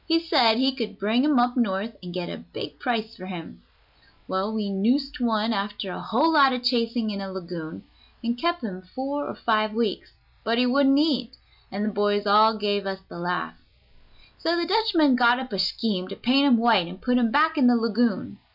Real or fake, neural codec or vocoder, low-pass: real; none; 5.4 kHz